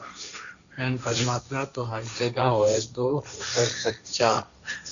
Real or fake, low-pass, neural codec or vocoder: fake; 7.2 kHz; codec, 16 kHz, 1.1 kbps, Voila-Tokenizer